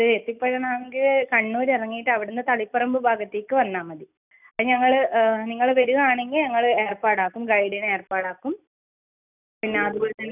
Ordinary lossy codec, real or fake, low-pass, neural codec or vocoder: none; real; 3.6 kHz; none